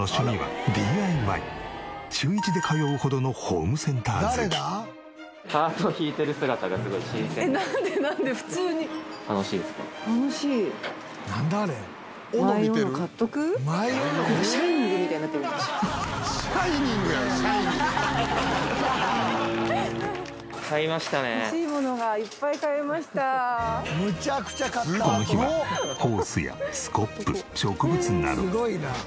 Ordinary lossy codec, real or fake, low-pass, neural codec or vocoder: none; real; none; none